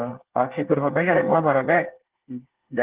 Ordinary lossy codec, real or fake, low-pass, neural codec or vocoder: Opus, 16 kbps; fake; 3.6 kHz; codec, 24 kHz, 1 kbps, SNAC